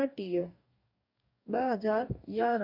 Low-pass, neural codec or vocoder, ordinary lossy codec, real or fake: 5.4 kHz; codec, 44.1 kHz, 2.6 kbps, DAC; MP3, 48 kbps; fake